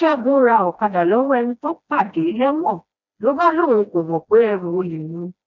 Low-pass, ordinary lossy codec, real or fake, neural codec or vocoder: 7.2 kHz; none; fake; codec, 16 kHz, 1 kbps, FreqCodec, smaller model